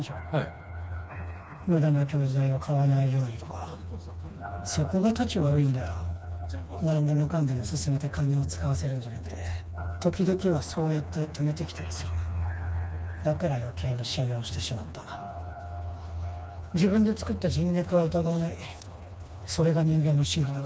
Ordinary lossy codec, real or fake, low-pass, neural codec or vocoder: none; fake; none; codec, 16 kHz, 2 kbps, FreqCodec, smaller model